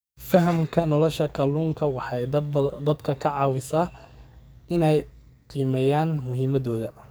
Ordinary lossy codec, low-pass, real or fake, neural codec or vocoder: none; none; fake; codec, 44.1 kHz, 2.6 kbps, SNAC